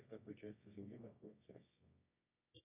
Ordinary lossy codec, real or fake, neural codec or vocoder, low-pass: AAC, 24 kbps; fake; codec, 24 kHz, 0.9 kbps, WavTokenizer, medium music audio release; 3.6 kHz